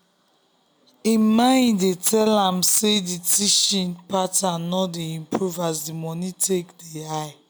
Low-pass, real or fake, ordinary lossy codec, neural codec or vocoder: none; real; none; none